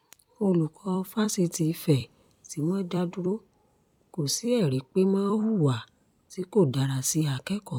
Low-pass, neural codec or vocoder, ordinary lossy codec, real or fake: 19.8 kHz; vocoder, 44.1 kHz, 128 mel bands every 256 samples, BigVGAN v2; none; fake